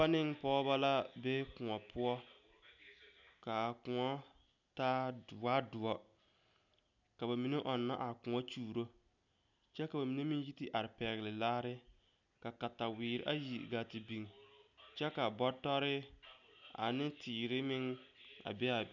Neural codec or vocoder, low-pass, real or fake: none; 7.2 kHz; real